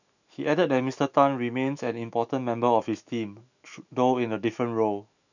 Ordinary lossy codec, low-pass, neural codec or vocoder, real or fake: none; 7.2 kHz; autoencoder, 48 kHz, 128 numbers a frame, DAC-VAE, trained on Japanese speech; fake